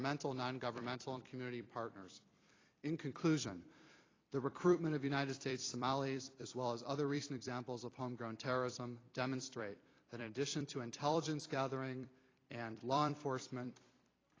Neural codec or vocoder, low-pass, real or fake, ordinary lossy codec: vocoder, 44.1 kHz, 128 mel bands every 256 samples, BigVGAN v2; 7.2 kHz; fake; AAC, 32 kbps